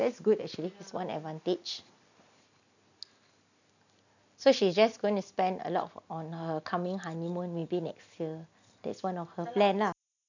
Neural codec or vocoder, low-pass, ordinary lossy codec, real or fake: none; 7.2 kHz; none; real